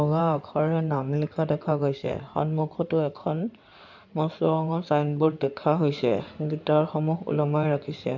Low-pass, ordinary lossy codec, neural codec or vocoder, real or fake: 7.2 kHz; none; codec, 16 kHz in and 24 kHz out, 2.2 kbps, FireRedTTS-2 codec; fake